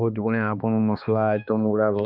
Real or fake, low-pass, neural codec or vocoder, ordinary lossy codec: fake; 5.4 kHz; codec, 16 kHz, 2 kbps, X-Codec, HuBERT features, trained on balanced general audio; none